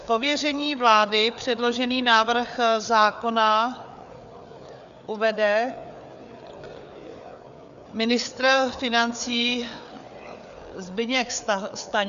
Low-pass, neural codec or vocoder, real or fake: 7.2 kHz; codec, 16 kHz, 4 kbps, FreqCodec, larger model; fake